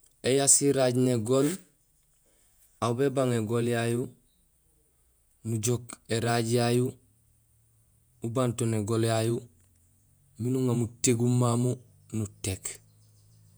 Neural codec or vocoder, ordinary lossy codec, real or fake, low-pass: vocoder, 48 kHz, 128 mel bands, Vocos; none; fake; none